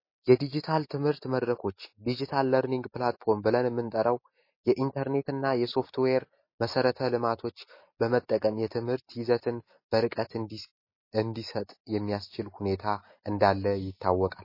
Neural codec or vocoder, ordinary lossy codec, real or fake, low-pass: none; MP3, 24 kbps; real; 5.4 kHz